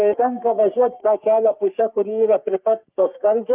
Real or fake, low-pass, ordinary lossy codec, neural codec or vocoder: fake; 3.6 kHz; Opus, 32 kbps; codec, 44.1 kHz, 3.4 kbps, Pupu-Codec